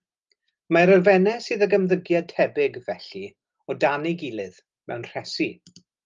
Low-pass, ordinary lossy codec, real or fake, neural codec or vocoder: 7.2 kHz; Opus, 32 kbps; real; none